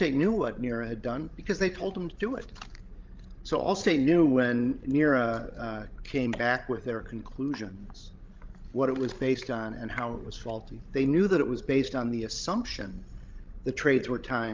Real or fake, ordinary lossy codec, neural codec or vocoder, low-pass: fake; Opus, 32 kbps; codec, 16 kHz, 16 kbps, FreqCodec, larger model; 7.2 kHz